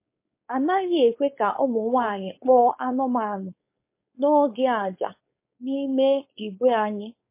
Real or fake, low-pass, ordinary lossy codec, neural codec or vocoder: fake; 3.6 kHz; MP3, 24 kbps; codec, 16 kHz, 4.8 kbps, FACodec